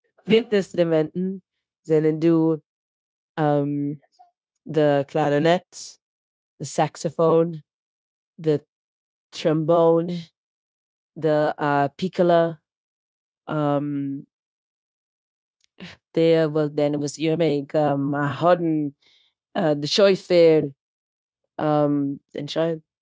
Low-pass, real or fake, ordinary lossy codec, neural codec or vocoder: none; fake; none; codec, 16 kHz, 0.9 kbps, LongCat-Audio-Codec